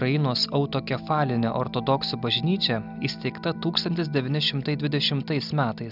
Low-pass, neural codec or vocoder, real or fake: 5.4 kHz; none; real